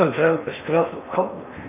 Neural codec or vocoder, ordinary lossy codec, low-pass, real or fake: codec, 16 kHz in and 24 kHz out, 0.8 kbps, FocalCodec, streaming, 65536 codes; none; 3.6 kHz; fake